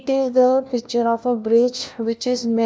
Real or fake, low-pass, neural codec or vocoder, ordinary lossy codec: fake; none; codec, 16 kHz, 1 kbps, FunCodec, trained on LibriTTS, 50 frames a second; none